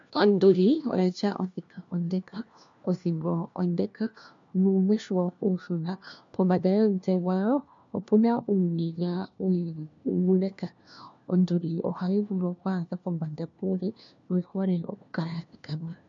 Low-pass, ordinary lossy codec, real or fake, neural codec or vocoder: 7.2 kHz; MP3, 64 kbps; fake; codec, 16 kHz, 1 kbps, FunCodec, trained on LibriTTS, 50 frames a second